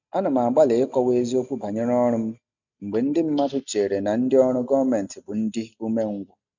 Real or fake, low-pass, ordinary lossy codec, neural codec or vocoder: real; 7.2 kHz; none; none